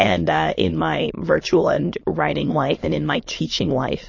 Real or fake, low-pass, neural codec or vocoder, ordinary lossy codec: fake; 7.2 kHz; autoencoder, 22.05 kHz, a latent of 192 numbers a frame, VITS, trained on many speakers; MP3, 32 kbps